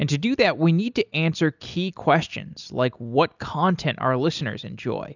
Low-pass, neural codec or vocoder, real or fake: 7.2 kHz; none; real